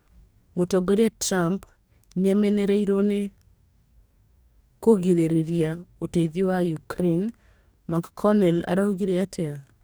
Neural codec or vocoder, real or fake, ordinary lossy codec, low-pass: codec, 44.1 kHz, 2.6 kbps, DAC; fake; none; none